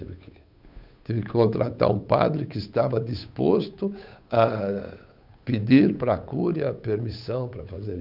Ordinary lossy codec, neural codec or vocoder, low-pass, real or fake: none; codec, 16 kHz, 8 kbps, FunCodec, trained on Chinese and English, 25 frames a second; 5.4 kHz; fake